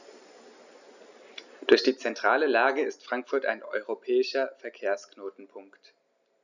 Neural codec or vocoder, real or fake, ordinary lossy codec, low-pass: none; real; none; 7.2 kHz